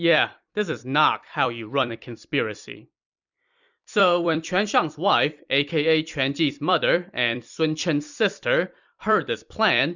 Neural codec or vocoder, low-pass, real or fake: vocoder, 44.1 kHz, 128 mel bands every 256 samples, BigVGAN v2; 7.2 kHz; fake